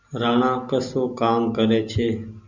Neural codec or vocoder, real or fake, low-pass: none; real; 7.2 kHz